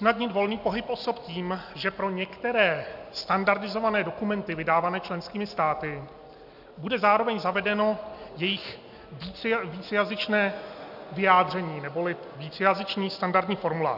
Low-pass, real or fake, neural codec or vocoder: 5.4 kHz; real; none